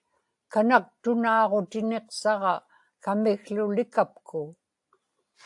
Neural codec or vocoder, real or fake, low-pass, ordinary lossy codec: none; real; 10.8 kHz; AAC, 64 kbps